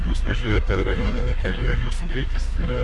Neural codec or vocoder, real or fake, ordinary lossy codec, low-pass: codec, 24 kHz, 1 kbps, SNAC; fake; MP3, 64 kbps; 10.8 kHz